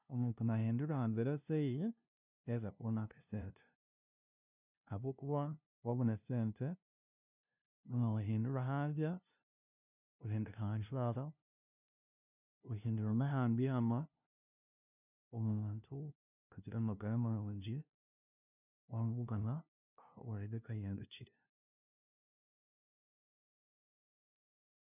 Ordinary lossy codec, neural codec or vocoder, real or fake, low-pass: none; codec, 16 kHz, 0.5 kbps, FunCodec, trained on LibriTTS, 25 frames a second; fake; 3.6 kHz